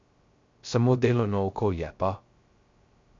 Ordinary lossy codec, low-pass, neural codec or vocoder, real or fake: MP3, 48 kbps; 7.2 kHz; codec, 16 kHz, 0.3 kbps, FocalCodec; fake